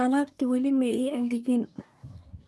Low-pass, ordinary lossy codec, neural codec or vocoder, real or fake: none; none; codec, 24 kHz, 1 kbps, SNAC; fake